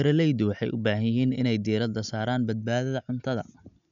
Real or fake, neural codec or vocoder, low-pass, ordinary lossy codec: real; none; 7.2 kHz; none